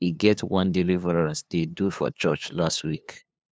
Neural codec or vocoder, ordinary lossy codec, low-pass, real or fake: codec, 16 kHz, 2 kbps, FunCodec, trained on LibriTTS, 25 frames a second; none; none; fake